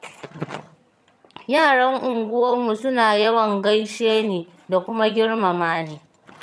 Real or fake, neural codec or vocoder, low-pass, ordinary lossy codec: fake; vocoder, 22.05 kHz, 80 mel bands, HiFi-GAN; none; none